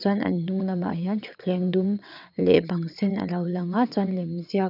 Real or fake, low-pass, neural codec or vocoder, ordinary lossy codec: fake; 5.4 kHz; vocoder, 22.05 kHz, 80 mel bands, WaveNeXt; none